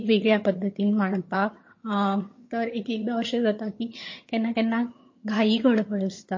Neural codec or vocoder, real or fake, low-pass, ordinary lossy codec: vocoder, 22.05 kHz, 80 mel bands, HiFi-GAN; fake; 7.2 kHz; MP3, 32 kbps